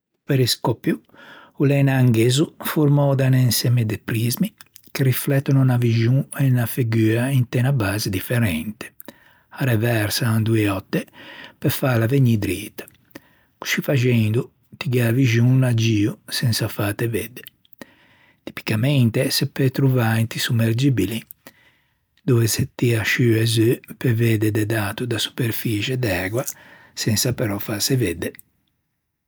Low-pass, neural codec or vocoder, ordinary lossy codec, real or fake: none; none; none; real